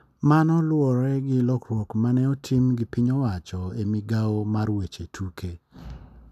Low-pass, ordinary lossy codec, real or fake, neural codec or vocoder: 14.4 kHz; none; real; none